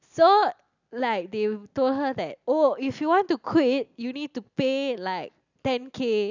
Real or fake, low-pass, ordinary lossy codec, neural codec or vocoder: real; 7.2 kHz; none; none